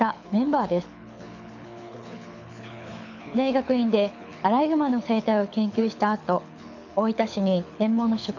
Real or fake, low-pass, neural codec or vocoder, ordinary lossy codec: fake; 7.2 kHz; codec, 24 kHz, 6 kbps, HILCodec; none